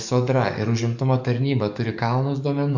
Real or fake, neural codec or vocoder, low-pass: fake; codec, 44.1 kHz, 7.8 kbps, DAC; 7.2 kHz